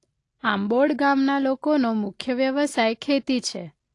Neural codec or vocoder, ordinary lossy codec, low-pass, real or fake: none; AAC, 48 kbps; 10.8 kHz; real